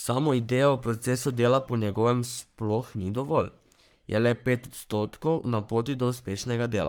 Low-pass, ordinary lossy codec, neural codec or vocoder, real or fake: none; none; codec, 44.1 kHz, 3.4 kbps, Pupu-Codec; fake